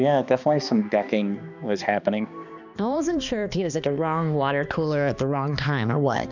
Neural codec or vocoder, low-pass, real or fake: codec, 16 kHz, 2 kbps, X-Codec, HuBERT features, trained on balanced general audio; 7.2 kHz; fake